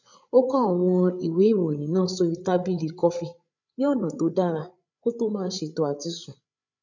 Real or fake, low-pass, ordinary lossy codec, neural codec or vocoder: fake; 7.2 kHz; none; codec, 16 kHz, 8 kbps, FreqCodec, larger model